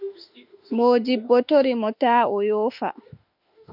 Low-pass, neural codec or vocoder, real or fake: 5.4 kHz; autoencoder, 48 kHz, 32 numbers a frame, DAC-VAE, trained on Japanese speech; fake